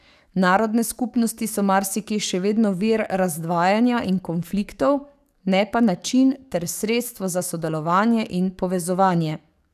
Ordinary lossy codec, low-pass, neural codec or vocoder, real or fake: none; 14.4 kHz; codec, 44.1 kHz, 7.8 kbps, DAC; fake